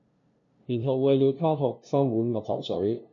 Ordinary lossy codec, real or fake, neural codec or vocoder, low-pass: MP3, 64 kbps; fake; codec, 16 kHz, 0.5 kbps, FunCodec, trained on LibriTTS, 25 frames a second; 7.2 kHz